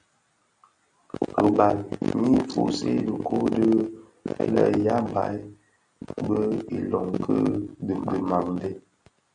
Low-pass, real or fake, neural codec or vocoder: 9.9 kHz; real; none